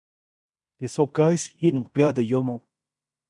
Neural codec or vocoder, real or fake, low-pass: codec, 16 kHz in and 24 kHz out, 0.9 kbps, LongCat-Audio-Codec, fine tuned four codebook decoder; fake; 10.8 kHz